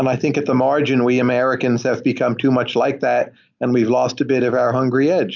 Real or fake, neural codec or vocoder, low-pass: real; none; 7.2 kHz